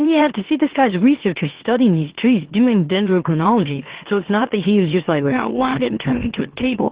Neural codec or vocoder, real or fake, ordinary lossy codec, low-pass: autoencoder, 44.1 kHz, a latent of 192 numbers a frame, MeloTTS; fake; Opus, 16 kbps; 3.6 kHz